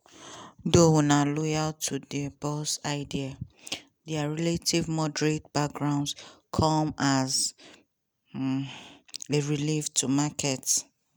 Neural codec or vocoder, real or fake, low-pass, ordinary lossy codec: none; real; none; none